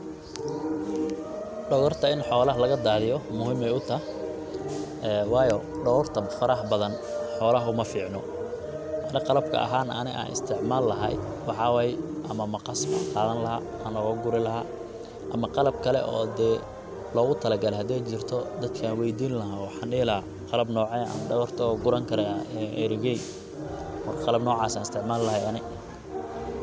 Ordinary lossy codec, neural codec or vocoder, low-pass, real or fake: none; none; none; real